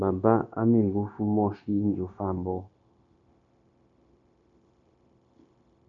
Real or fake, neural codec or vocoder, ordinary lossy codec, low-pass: fake; codec, 16 kHz, 0.9 kbps, LongCat-Audio-Codec; AAC, 48 kbps; 7.2 kHz